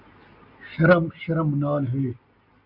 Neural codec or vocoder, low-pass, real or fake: none; 5.4 kHz; real